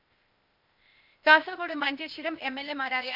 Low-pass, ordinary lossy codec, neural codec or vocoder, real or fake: 5.4 kHz; MP3, 48 kbps; codec, 16 kHz, 0.8 kbps, ZipCodec; fake